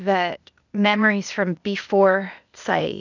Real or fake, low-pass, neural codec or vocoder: fake; 7.2 kHz; codec, 16 kHz, 0.8 kbps, ZipCodec